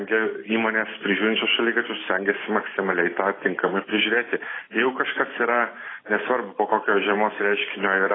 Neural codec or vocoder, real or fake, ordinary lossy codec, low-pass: none; real; AAC, 16 kbps; 7.2 kHz